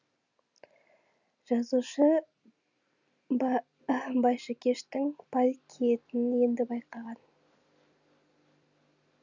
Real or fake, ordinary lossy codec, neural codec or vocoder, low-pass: real; none; none; 7.2 kHz